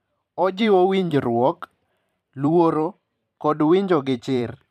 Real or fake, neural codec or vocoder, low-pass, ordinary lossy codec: fake; vocoder, 44.1 kHz, 128 mel bands every 512 samples, BigVGAN v2; 14.4 kHz; none